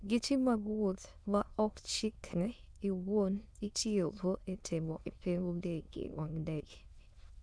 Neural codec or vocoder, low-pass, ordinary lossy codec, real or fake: autoencoder, 22.05 kHz, a latent of 192 numbers a frame, VITS, trained on many speakers; none; none; fake